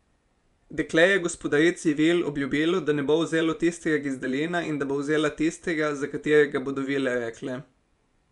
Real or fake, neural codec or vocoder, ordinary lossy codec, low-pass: fake; vocoder, 24 kHz, 100 mel bands, Vocos; none; 10.8 kHz